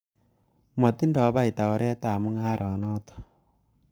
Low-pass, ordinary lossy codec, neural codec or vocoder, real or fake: none; none; codec, 44.1 kHz, 7.8 kbps, Pupu-Codec; fake